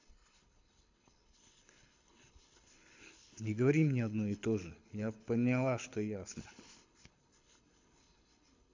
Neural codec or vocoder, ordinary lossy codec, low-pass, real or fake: codec, 24 kHz, 6 kbps, HILCodec; AAC, 48 kbps; 7.2 kHz; fake